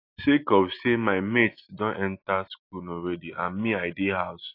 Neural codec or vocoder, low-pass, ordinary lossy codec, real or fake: none; 5.4 kHz; AAC, 32 kbps; real